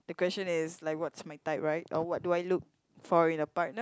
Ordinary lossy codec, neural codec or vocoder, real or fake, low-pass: none; none; real; none